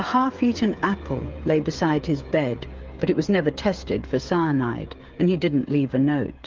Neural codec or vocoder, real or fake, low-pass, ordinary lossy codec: codec, 16 kHz, 8 kbps, FreqCodec, smaller model; fake; 7.2 kHz; Opus, 32 kbps